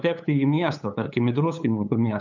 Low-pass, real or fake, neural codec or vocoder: 7.2 kHz; fake; codec, 16 kHz, 8 kbps, FunCodec, trained on LibriTTS, 25 frames a second